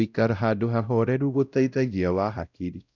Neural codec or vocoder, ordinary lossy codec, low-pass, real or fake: codec, 16 kHz, 0.5 kbps, X-Codec, WavLM features, trained on Multilingual LibriSpeech; none; 7.2 kHz; fake